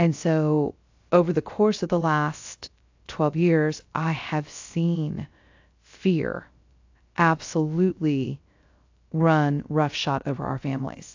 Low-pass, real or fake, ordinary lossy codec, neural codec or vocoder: 7.2 kHz; fake; AAC, 48 kbps; codec, 16 kHz, 0.3 kbps, FocalCodec